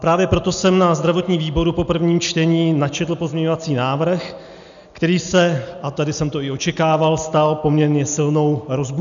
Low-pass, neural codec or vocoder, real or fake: 7.2 kHz; none; real